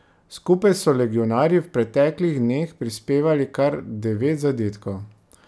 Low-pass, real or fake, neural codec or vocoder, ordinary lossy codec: none; real; none; none